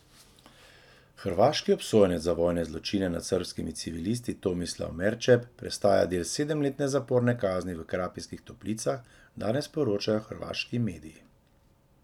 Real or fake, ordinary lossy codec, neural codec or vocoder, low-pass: real; none; none; 19.8 kHz